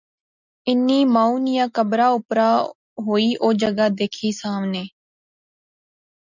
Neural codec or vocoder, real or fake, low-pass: none; real; 7.2 kHz